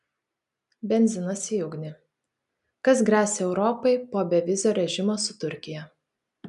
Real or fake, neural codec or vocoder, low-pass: real; none; 10.8 kHz